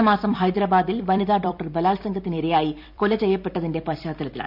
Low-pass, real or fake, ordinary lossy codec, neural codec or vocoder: 5.4 kHz; fake; none; vocoder, 44.1 kHz, 128 mel bands every 256 samples, BigVGAN v2